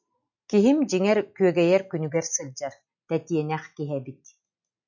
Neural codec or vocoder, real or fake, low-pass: none; real; 7.2 kHz